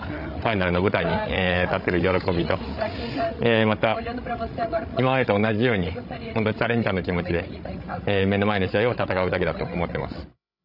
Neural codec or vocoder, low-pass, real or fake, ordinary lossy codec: codec, 16 kHz, 16 kbps, FreqCodec, larger model; 5.4 kHz; fake; none